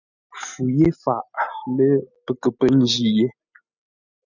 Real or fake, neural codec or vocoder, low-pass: real; none; 7.2 kHz